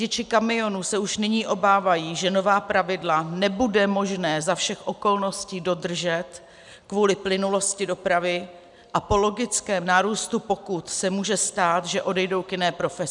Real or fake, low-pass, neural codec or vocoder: real; 10.8 kHz; none